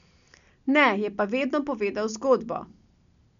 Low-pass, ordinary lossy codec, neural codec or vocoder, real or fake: 7.2 kHz; none; none; real